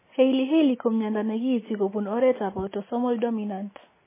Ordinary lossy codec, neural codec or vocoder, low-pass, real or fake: MP3, 16 kbps; none; 3.6 kHz; real